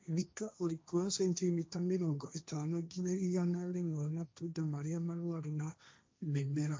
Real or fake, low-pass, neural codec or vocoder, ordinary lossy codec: fake; none; codec, 16 kHz, 1.1 kbps, Voila-Tokenizer; none